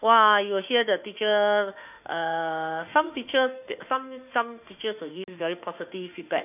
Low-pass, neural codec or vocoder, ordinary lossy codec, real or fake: 3.6 kHz; autoencoder, 48 kHz, 32 numbers a frame, DAC-VAE, trained on Japanese speech; none; fake